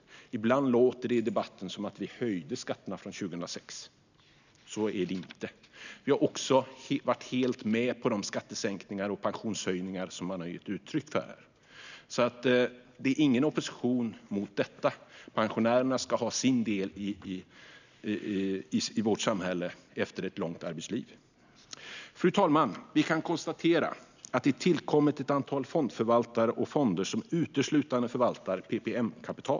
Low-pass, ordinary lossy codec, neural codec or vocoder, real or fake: 7.2 kHz; none; none; real